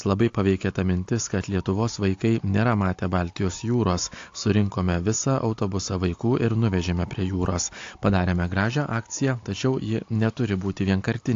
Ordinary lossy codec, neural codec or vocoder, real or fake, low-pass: AAC, 48 kbps; none; real; 7.2 kHz